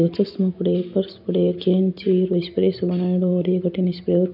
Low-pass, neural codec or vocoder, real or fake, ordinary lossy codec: 5.4 kHz; none; real; none